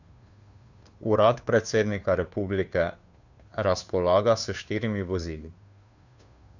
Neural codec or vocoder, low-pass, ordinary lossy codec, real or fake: codec, 16 kHz, 2 kbps, FunCodec, trained on Chinese and English, 25 frames a second; 7.2 kHz; none; fake